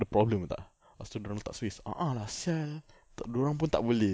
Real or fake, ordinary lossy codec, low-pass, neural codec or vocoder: real; none; none; none